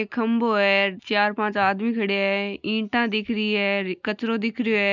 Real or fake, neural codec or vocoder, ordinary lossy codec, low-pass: real; none; none; 7.2 kHz